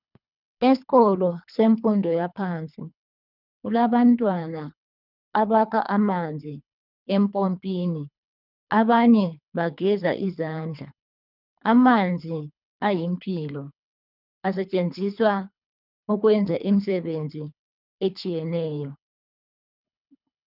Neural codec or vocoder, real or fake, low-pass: codec, 24 kHz, 3 kbps, HILCodec; fake; 5.4 kHz